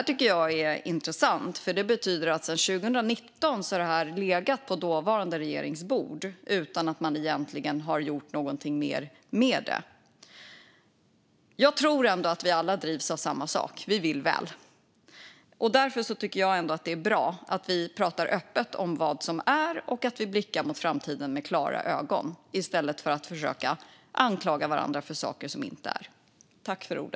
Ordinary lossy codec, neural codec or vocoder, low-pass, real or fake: none; none; none; real